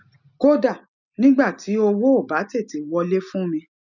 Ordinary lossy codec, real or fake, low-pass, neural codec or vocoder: none; real; 7.2 kHz; none